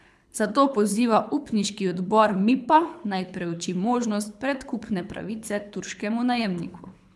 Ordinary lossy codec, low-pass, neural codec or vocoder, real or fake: none; none; codec, 24 kHz, 6 kbps, HILCodec; fake